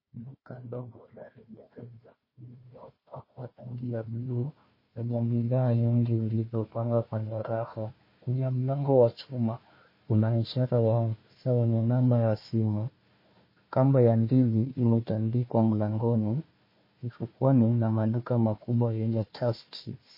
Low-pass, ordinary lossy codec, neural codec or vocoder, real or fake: 5.4 kHz; MP3, 24 kbps; codec, 16 kHz, 1 kbps, FunCodec, trained on Chinese and English, 50 frames a second; fake